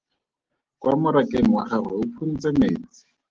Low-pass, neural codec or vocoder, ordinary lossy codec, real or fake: 7.2 kHz; none; Opus, 16 kbps; real